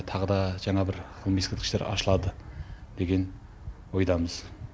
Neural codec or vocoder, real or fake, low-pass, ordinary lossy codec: none; real; none; none